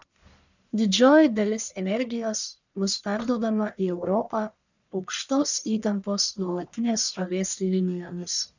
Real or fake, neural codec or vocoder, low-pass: fake; codec, 44.1 kHz, 1.7 kbps, Pupu-Codec; 7.2 kHz